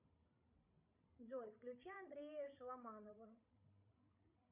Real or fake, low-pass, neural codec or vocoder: fake; 3.6 kHz; codec, 16 kHz, 16 kbps, FreqCodec, larger model